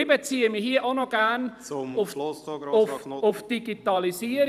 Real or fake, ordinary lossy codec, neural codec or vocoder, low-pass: fake; none; vocoder, 44.1 kHz, 128 mel bands every 512 samples, BigVGAN v2; 14.4 kHz